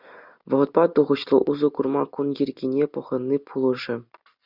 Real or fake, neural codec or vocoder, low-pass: real; none; 5.4 kHz